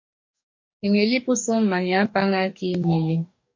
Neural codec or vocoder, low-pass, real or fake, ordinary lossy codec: codec, 44.1 kHz, 2.6 kbps, DAC; 7.2 kHz; fake; MP3, 48 kbps